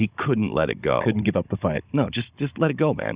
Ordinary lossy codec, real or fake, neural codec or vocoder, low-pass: Opus, 64 kbps; fake; codec, 16 kHz, 16 kbps, FunCodec, trained on Chinese and English, 50 frames a second; 3.6 kHz